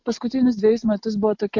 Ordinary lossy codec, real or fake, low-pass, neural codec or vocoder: MP3, 48 kbps; real; 7.2 kHz; none